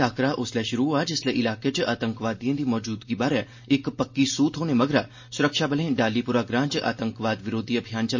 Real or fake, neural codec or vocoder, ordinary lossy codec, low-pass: real; none; MP3, 32 kbps; 7.2 kHz